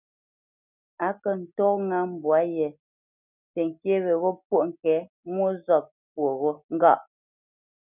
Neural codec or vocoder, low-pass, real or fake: none; 3.6 kHz; real